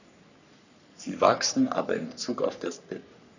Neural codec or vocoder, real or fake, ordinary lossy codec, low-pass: codec, 44.1 kHz, 3.4 kbps, Pupu-Codec; fake; none; 7.2 kHz